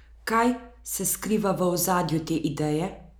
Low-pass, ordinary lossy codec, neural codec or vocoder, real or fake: none; none; none; real